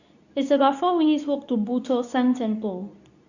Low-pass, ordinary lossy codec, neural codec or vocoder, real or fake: 7.2 kHz; none; codec, 24 kHz, 0.9 kbps, WavTokenizer, medium speech release version 2; fake